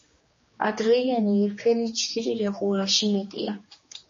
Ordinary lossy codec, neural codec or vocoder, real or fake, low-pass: MP3, 32 kbps; codec, 16 kHz, 2 kbps, X-Codec, HuBERT features, trained on general audio; fake; 7.2 kHz